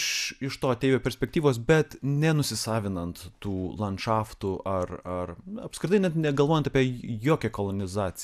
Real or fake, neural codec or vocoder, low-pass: real; none; 14.4 kHz